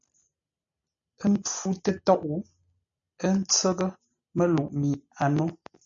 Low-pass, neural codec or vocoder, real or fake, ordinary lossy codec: 7.2 kHz; none; real; MP3, 64 kbps